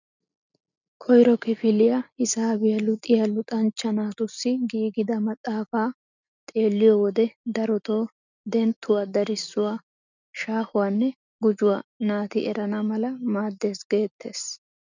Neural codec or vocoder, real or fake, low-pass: none; real; 7.2 kHz